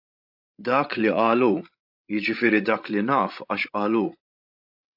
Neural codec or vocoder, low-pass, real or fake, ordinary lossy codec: codec, 16 kHz, 16 kbps, FreqCodec, larger model; 5.4 kHz; fake; AAC, 48 kbps